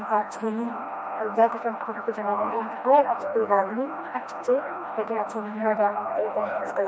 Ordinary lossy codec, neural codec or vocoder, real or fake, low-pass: none; codec, 16 kHz, 1 kbps, FreqCodec, smaller model; fake; none